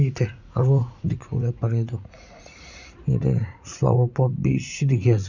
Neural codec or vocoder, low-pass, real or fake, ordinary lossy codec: vocoder, 44.1 kHz, 80 mel bands, Vocos; 7.2 kHz; fake; none